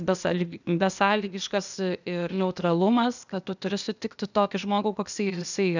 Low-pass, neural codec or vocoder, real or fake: 7.2 kHz; codec, 16 kHz, 0.8 kbps, ZipCodec; fake